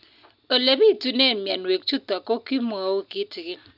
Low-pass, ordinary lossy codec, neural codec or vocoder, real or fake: 5.4 kHz; none; none; real